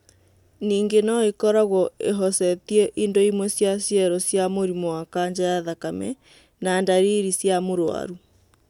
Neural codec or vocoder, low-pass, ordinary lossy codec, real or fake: none; 19.8 kHz; none; real